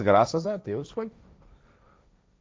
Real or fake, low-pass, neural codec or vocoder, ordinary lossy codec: fake; none; codec, 16 kHz, 1.1 kbps, Voila-Tokenizer; none